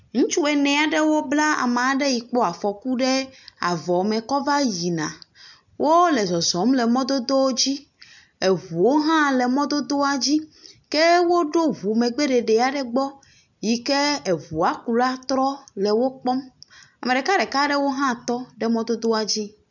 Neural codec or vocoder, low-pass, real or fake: none; 7.2 kHz; real